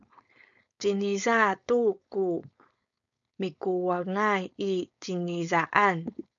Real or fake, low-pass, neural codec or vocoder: fake; 7.2 kHz; codec, 16 kHz, 4.8 kbps, FACodec